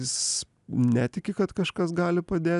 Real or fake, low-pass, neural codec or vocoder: real; 10.8 kHz; none